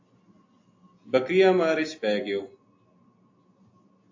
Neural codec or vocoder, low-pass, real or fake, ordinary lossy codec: none; 7.2 kHz; real; AAC, 48 kbps